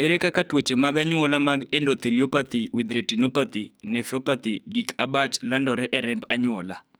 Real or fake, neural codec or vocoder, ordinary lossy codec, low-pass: fake; codec, 44.1 kHz, 2.6 kbps, SNAC; none; none